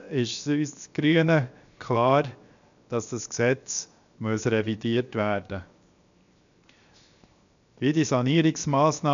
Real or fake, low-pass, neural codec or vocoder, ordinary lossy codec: fake; 7.2 kHz; codec, 16 kHz, 0.7 kbps, FocalCodec; AAC, 96 kbps